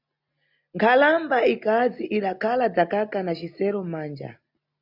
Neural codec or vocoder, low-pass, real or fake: none; 5.4 kHz; real